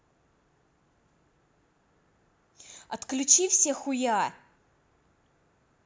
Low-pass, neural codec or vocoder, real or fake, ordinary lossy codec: none; none; real; none